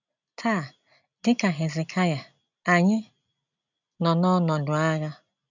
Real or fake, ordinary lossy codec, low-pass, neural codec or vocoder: real; none; 7.2 kHz; none